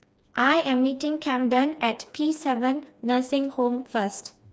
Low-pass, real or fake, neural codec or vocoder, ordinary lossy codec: none; fake; codec, 16 kHz, 2 kbps, FreqCodec, smaller model; none